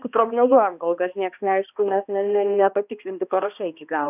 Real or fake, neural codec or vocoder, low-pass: fake; codec, 16 kHz, 1 kbps, X-Codec, HuBERT features, trained on balanced general audio; 3.6 kHz